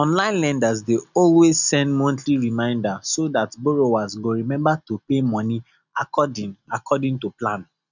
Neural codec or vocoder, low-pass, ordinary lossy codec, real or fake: none; 7.2 kHz; none; real